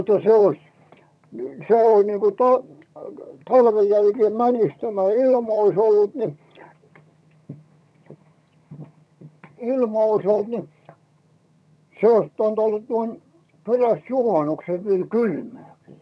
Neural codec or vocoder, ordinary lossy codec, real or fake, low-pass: vocoder, 22.05 kHz, 80 mel bands, HiFi-GAN; none; fake; none